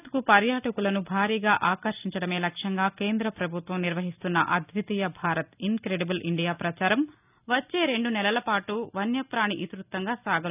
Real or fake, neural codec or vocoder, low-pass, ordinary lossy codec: real; none; 3.6 kHz; none